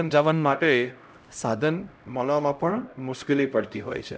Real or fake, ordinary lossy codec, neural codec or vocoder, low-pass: fake; none; codec, 16 kHz, 0.5 kbps, X-Codec, HuBERT features, trained on LibriSpeech; none